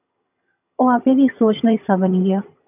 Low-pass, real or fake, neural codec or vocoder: 3.6 kHz; fake; vocoder, 22.05 kHz, 80 mel bands, HiFi-GAN